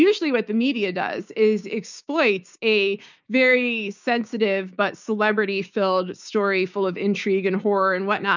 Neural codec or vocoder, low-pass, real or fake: codec, 16 kHz, 6 kbps, DAC; 7.2 kHz; fake